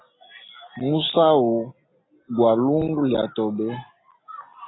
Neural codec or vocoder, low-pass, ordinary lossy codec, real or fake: none; 7.2 kHz; AAC, 16 kbps; real